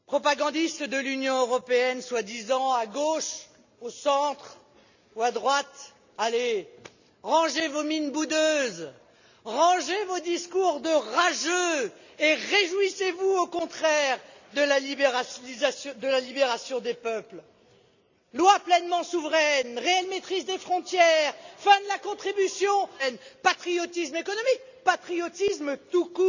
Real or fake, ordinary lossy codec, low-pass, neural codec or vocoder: real; none; 7.2 kHz; none